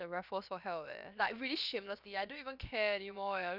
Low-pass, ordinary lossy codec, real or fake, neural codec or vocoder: 5.4 kHz; none; fake; codec, 16 kHz, about 1 kbps, DyCAST, with the encoder's durations